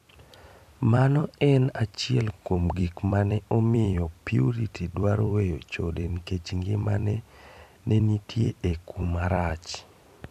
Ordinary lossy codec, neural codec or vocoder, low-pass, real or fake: none; vocoder, 44.1 kHz, 128 mel bands every 256 samples, BigVGAN v2; 14.4 kHz; fake